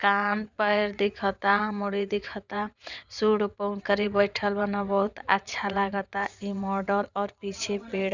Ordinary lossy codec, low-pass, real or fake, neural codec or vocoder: Opus, 64 kbps; 7.2 kHz; fake; vocoder, 22.05 kHz, 80 mel bands, WaveNeXt